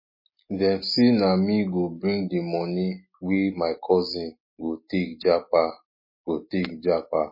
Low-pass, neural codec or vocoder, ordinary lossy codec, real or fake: 5.4 kHz; none; MP3, 24 kbps; real